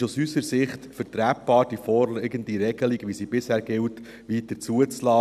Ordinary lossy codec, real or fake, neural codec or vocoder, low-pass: none; real; none; 14.4 kHz